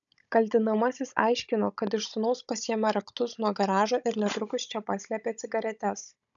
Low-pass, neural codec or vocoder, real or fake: 7.2 kHz; codec, 16 kHz, 16 kbps, FunCodec, trained on Chinese and English, 50 frames a second; fake